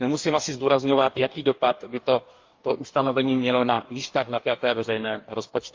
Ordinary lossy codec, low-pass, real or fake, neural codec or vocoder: Opus, 32 kbps; 7.2 kHz; fake; codec, 44.1 kHz, 2.6 kbps, DAC